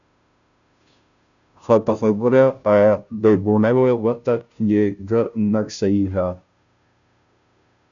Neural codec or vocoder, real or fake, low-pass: codec, 16 kHz, 0.5 kbps, FunCodec, trained on Chinese and English, 25 frames a second; fake; 7.2 kHz